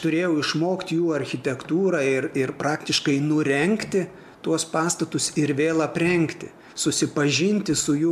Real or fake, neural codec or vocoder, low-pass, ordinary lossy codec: real; none; 14.4 kHz; AAC, 96 kbps